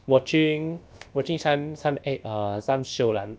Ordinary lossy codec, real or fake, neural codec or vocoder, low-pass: none; fake; codec, 16 kHz, about 1 kbps, DyCAST, with the encoder's durations; none